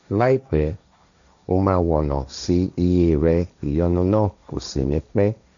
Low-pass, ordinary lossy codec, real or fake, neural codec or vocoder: 7.2 kHz; none; fake; codec, 16 kHz, 1.1 kbps, Voila-Tokenizer